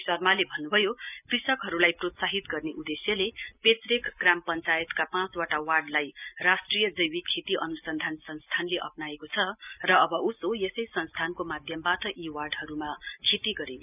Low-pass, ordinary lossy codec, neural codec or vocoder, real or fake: 3.6 kHz; none; none; real